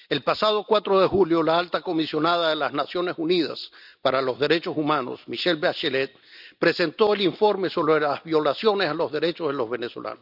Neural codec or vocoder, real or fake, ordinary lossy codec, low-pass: none; real; none; 5.4 kHz